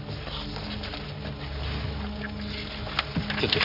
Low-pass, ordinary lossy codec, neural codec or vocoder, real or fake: 5.4 kHz; none; none; real